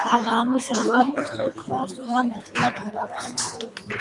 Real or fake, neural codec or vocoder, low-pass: fake; codec, 24 kHz, 3 kbps, HILCodec; 10.8 kHz